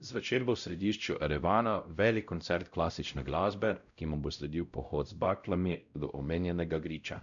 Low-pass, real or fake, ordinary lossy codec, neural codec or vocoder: 7.2 kHz; fake; none; codec, 16 kHz, 0.5 kbps, X-Codec, WavLM features, trained on Multilingual LibriSpeech